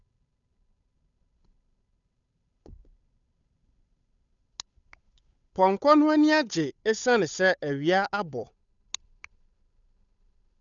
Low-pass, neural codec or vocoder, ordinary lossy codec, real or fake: 7.2 kHz; codec, 16 kHz, 8 kbps, FunCodec, trained on Chinese and English, 25 frames a second; none; fake